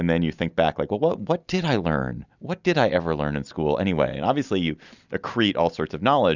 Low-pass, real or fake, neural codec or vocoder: 7.2 kHz; real; none